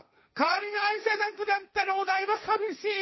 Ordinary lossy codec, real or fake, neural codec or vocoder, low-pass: MP3, 24 kbps; fake; codec, 16 kHz, 1.1 kbps, Voila-Tokenizer; 7.2 kHz